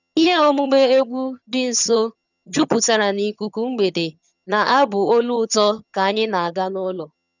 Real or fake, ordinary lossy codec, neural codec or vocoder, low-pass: fake; none; vocoder, 22.05 kHz, 80 mel bands, HiFi-GAN; 7.2 kHz